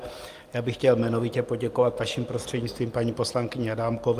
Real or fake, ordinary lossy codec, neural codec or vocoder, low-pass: fake; Opus, 32 kbps; vocoder, 44.1 kHz, 128 mel bands every 256 samples, BigVGAN v2; 14.4 kHz